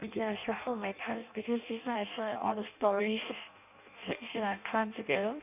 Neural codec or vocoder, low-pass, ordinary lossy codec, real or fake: codec, 16 kHz in and 24 kHz out, 0.6 kbps, FireRedTTS-2 codec; 3.6 kHz; none; fake